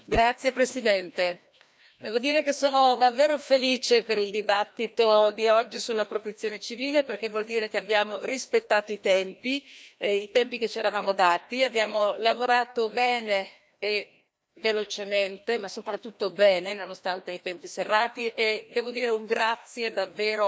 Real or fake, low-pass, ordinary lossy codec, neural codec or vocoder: fake; none; none; codec, 16 kHz, 1 kbps, FreqCodec, larger model